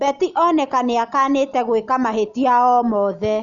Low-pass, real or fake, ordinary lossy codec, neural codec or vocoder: 7.2 kHz; real; none; none